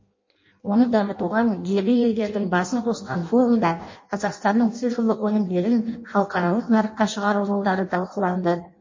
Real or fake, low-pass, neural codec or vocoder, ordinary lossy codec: fake; 7.2 kHz; codec, 16 kHz in and 24 kHz out, 0.6 kbps, FireRedTTS-2 codec; MP3, 32 kbps